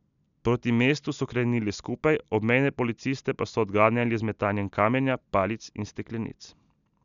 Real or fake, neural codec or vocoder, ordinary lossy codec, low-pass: real; none; none; 7.2 kHz